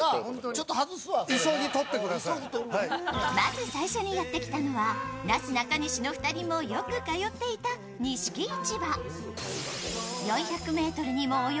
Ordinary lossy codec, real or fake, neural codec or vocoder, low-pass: none; real; none; none